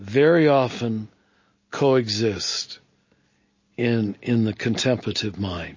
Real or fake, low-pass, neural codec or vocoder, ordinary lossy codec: real; 7.2 kHz; none; MP3, 32 kbps